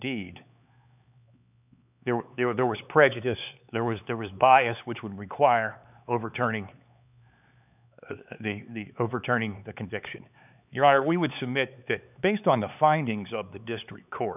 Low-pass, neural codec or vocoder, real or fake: 3.6 kHz; codec, 16 kHz, 4 kbps, X-Codec, HuBERT features, trained on LibriSpeech; fake